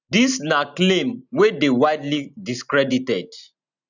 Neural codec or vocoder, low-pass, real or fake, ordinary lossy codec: none; 7.2 kHz; real; none